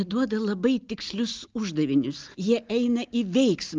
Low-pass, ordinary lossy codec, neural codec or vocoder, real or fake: 7.2 kHz; Opus, 32 kbps; none; real